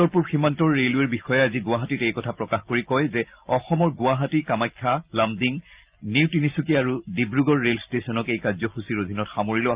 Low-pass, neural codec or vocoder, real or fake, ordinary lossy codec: 3.6 kHz; none; real; Opus, 32 kbps